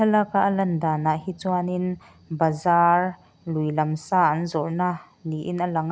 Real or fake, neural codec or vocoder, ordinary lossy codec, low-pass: real; none; none; none